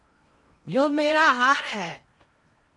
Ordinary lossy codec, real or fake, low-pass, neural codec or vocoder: MP3, 48 kbps; fake; 10.8 kHz; codec, 16 kHz in and 24 kHz out, 0.8 kbps, FocalCodec, streaming, 65536 codes